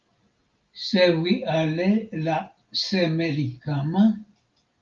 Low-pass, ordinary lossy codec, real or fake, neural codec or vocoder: 7.2 kHz; Opus, 32 kbps; real; none